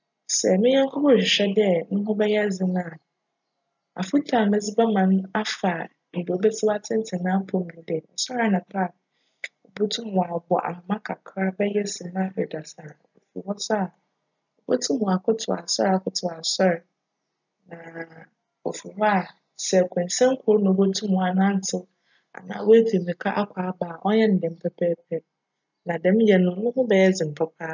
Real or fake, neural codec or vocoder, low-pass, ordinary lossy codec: real; none; 7.2 kHz; none